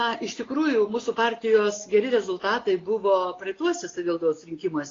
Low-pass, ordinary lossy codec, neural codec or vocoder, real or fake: 7.2 kHz; AAC, 32 kbps; none; real